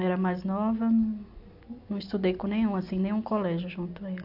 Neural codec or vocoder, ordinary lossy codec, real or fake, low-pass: none; AAC, 48 kbps; real; 5.4 kHz